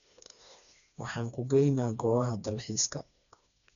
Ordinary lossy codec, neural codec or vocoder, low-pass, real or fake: none; codec, 16 kHz, 2 kbps, FreqCodec, smaller model; 7.2 kHz; fake